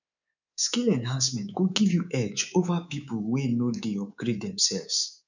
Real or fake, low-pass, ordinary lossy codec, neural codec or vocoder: fake; 7.2 kHz; none; codec, 24 kHz, 3.1 kbps, DualCodec